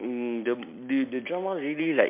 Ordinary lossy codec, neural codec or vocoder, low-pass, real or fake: MP3, 32 kbps; none; 3.6 kHz; real